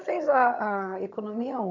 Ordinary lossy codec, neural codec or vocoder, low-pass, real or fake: none; vocoder, 22.05 kHz, 80 mel bands, HiFi-GAN; 7.2 kHz; fake